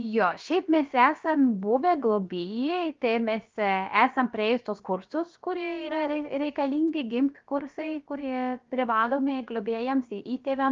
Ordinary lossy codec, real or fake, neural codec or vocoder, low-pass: Opus, 32 kbps; fake; codec, 16 kHz, about 1 kbps, DyCAST, with the encoder's durations; 7.2 kHz